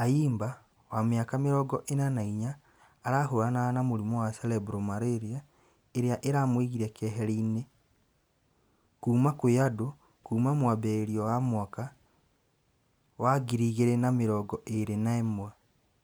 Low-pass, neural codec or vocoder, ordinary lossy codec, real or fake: none; none; none; real